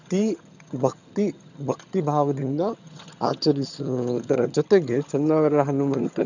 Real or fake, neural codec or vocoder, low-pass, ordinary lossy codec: fake; vocoder, 22.05 kHz, 80 mel bands, HiFi-GAN; 7.2 kHz; none